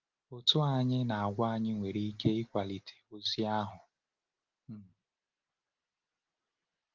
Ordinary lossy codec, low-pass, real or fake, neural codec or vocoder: Opus, 32 kbps; 7.2 kHz; real; none